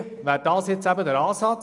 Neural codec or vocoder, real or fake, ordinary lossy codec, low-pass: none; real; none; 10.8 kHz